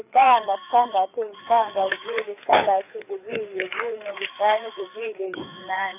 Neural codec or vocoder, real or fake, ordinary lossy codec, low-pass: codec, 16 kHz, 4 kbps, FreqCodec, larger model; fake; none; 3.6 kHz